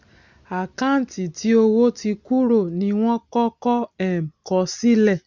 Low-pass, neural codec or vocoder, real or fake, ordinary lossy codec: 7.2 kHz; none; real; none